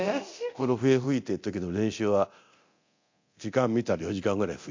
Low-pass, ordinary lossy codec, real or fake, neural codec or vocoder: 7.2 kHz; MP3, 48 kbps; fake; codec, 24 kHz, 0.9 kbps, DualCodec